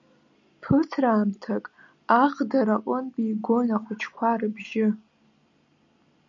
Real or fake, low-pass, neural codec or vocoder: real; 7.2 kHz; none